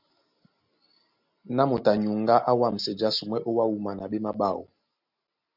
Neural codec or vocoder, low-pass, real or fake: none; 5.4 kHz; real